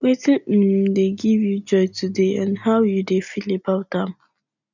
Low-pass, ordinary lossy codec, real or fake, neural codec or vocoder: 7.2 kHz; none; real; none